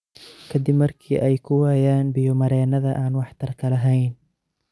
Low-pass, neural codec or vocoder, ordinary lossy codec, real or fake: none; none; none; real